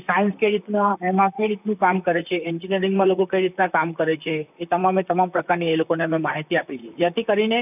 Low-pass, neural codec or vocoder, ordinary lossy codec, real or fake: 3.6 kHz; vocoder, 44.1 kHz, 128 mel bands, Pupu-Vocoder; none; fake